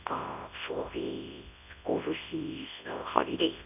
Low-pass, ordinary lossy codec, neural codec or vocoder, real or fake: 3.6 kHz; none; codec, 24 kHz, 0.9 kbps, WavTokenizer, large speech release; fake